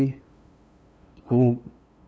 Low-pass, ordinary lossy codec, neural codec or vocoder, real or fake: none; none; codec, 16 kHz, 2 kbps, FunCodec, trained on LibriTTS, 25 frames a second; fake